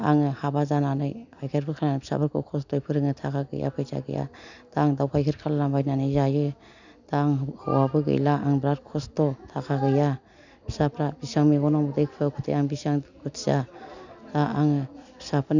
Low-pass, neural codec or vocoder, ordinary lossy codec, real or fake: 7.2 kHz; none; none; real